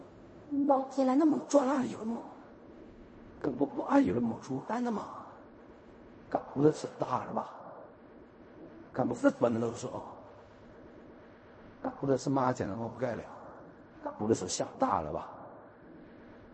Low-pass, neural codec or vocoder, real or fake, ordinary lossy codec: 9.9 kHz; codec, 16 kHz in and 24 kHz out, 0.4 kbps, LongCat-Audio-Codec, fine tuned four codebook decoder; fake; MP3, 32 kbps